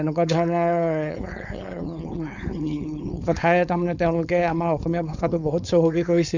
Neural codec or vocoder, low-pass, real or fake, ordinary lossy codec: codec, 16 kHz, 4.8 kbps, FACodec; 7.2 kHz; fake; none